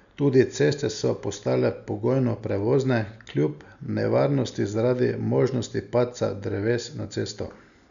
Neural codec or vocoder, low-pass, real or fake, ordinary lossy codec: none; 7.2 kHz; real; none